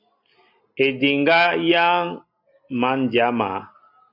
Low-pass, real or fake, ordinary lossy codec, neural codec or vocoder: 5.4 kHz; real; Opus, 64 kbps; none